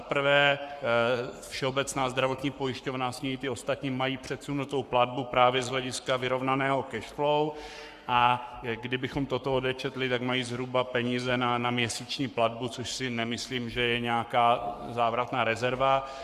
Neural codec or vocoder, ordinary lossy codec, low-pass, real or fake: codec, 44.1 kHz, 7.8 kbps, Pupu-Codec; Opus, 64 kbps; 14.4 kHz; fake